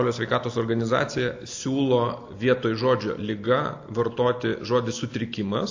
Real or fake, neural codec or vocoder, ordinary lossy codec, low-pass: real; none; AAC, 48 kbps; 7.2 kHz